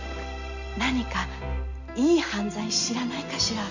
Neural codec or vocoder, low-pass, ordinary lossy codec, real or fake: none; 7.2 kHz; none; real